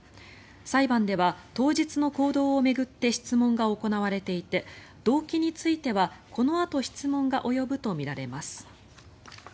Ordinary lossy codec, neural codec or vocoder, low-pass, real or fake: none; none; none; real